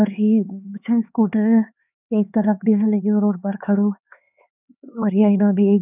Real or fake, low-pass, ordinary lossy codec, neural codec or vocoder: fake; 3.6 kHz; none; codec, 16 kHz, 4 kbps, X-Codec, HuBERT features, trained on LibriSpeech